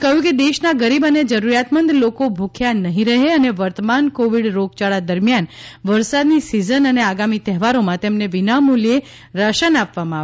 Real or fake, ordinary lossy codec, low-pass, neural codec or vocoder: real; none; none; none